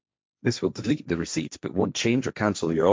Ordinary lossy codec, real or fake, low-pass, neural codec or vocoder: none; fake; none; codec, 16 kHz, 1.1 kbps, Voila-Tokenizer